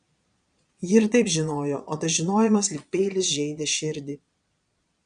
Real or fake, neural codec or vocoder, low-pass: fake; vocoder, 22.05 kHz, 80 mel bands, Vocos; 9.9 kHz